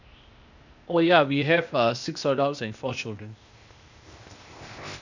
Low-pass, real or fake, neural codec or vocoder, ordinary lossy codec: 7.2 kHz; fake; codec, 16 kHz, 0.8 kbps, ZipCodec; none